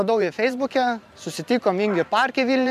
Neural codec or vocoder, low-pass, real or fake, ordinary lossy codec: vocoder, 44.1 kHz, 128 mel bands every 512 samples, BigVGAN v2; 14.4 kHz; fake; AAC, 96 kbps